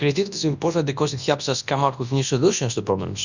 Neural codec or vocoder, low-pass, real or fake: codec, 24 kHz, 0.9 kbps, WavTokenizer, large speech release; 7.2 kHz; fake